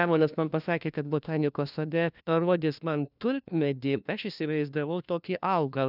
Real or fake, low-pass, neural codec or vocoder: fake; 5.4 kHz; codec, 16 kHz, 1 kbps, FunCodec, trained on LibriTTS, 50 frames a second